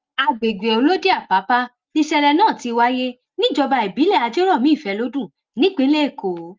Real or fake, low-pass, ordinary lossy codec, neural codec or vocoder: real; 7.2 kHz; Opus, 24 kbps; none